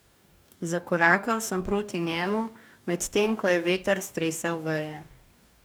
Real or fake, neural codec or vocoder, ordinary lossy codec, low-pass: fake; codec, 44.1 kHz, 2.6 kbps, DAC; none; none